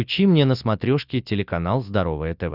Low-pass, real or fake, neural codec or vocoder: 5.4 kHz; real; none